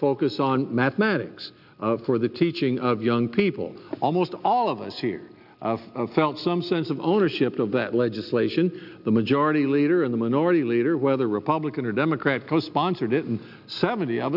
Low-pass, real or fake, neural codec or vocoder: 5.4 kHz; real; none